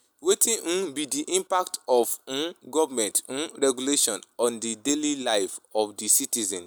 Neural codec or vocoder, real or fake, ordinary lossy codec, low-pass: none; real; none; none